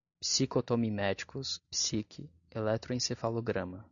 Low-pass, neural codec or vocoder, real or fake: 7.2 kHz; none; real